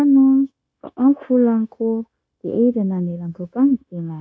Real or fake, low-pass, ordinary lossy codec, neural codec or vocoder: fake; none; none; codec, 16 kHz, 0.9 kbps, LongCat-Audio-Codec